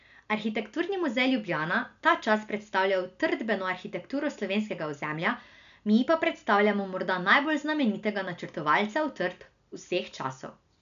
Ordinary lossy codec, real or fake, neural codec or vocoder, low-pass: none; real; none; 7.2 kHz